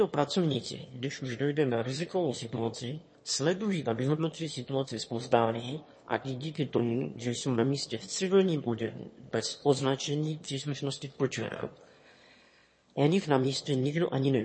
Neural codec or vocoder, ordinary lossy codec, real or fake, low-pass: autoencoder, 22.05 kHz, a latent of 192 numbers a frame, VITS, trained on one speaker; MP3, 32 kbps; fake; 9.9 kHz